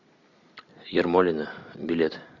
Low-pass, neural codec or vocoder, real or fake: 7.2 kHz; none; real